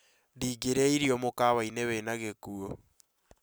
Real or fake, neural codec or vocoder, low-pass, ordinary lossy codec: real; none; none; none